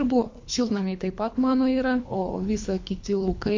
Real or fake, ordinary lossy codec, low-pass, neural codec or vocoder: fake; MP3, 48 kbps; 7.2 kHz; codec, 24 kHz, 3 kbps, HILCodec